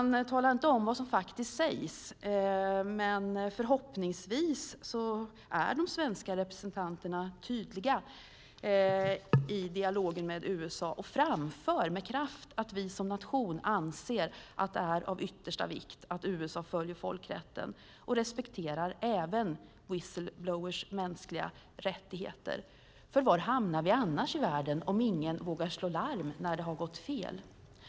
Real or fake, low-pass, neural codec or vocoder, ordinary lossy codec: real; none; none; none